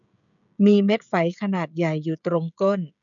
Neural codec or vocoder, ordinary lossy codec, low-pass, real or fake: codec, 16 kHz, 16 kbps, FreqCodec, smaller model; none; 7.2 kHz; fake